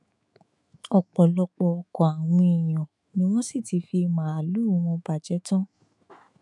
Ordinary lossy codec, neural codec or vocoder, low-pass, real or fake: none; autoencoder, 48 kHz, 128 numbers a frame, DAC-VAE, trained on Japanese speech; 10.8 kHz; fake